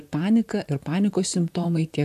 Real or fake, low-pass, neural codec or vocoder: fake; 14.4 kHz; vocoder, 44.1 kHz, 128 mel bands, Pupu-Vocoder